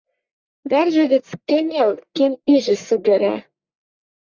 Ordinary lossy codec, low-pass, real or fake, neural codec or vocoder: Opus, 64 kbps; 7.2 kHz; fake; codec, 44.1 kHz, 1.7 kbps, Pupu-Codec